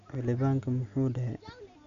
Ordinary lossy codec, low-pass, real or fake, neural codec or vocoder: Opus, 64 kbps; 7.2 kHz; real; none